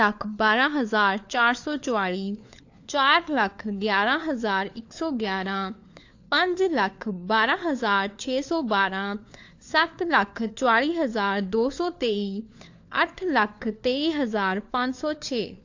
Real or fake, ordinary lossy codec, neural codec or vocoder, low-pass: fake; AAC, 48 kbps; codec, 16 kHz, 4 kbps, FunCodec, trained on LibriTTS, 50 frames a second; 7.2 kHz